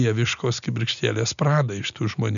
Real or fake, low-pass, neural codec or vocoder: real; 7.2 kHz; none